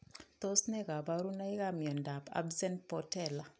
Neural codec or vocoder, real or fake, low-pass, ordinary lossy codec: none; real; none; none